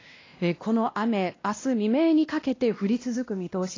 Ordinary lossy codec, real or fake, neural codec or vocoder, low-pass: AAC, 32 kbps; fake; codec, 16 kHz, 1 kbps, X-Codec, WavLM features, trained on Multilingual LibriSpeech; 7.2 kHz